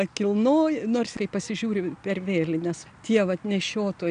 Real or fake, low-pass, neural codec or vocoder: real; 9.9 kHz; none